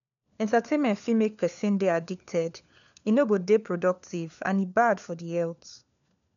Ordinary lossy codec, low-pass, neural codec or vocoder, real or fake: none; 7.2 kHz; codec, 16 kHz, 4 kbps, FunCodec, trained on LibriTTS, 50 frames a second; fake